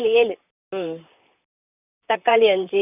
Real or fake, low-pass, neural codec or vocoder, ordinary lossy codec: fake; 3.6 kHz; vocoder, 44.1 kHz, 128 mel bands, Pupu-Vocoder; none